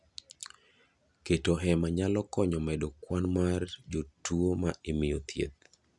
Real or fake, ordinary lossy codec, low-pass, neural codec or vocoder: real; none; 10.8 kHz; none